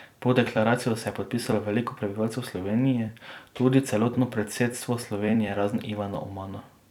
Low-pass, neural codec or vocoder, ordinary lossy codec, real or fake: 19.8 kHz; vocoder, 44.1 kHz, 128 mel bands every 256 samples, BigVGAN v2; none; fake